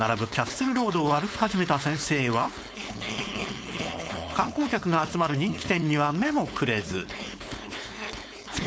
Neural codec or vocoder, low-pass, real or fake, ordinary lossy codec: codec, 16 kHz, 4.8 kbps, FACodec; none; fake; none